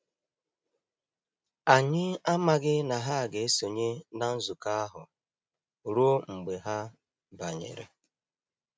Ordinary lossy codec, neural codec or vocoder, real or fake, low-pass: none; none; real; none